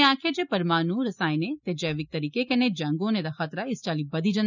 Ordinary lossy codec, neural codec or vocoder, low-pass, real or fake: none; none; 7.2 kHz; real